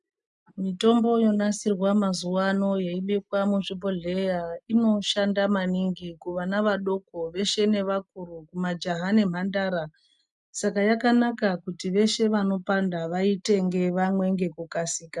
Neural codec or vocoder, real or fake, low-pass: none; real; 10.8 kHz